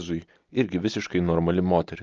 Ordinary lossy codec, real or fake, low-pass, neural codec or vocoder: Opus, 24 kbps; real; 7.2 kHz; none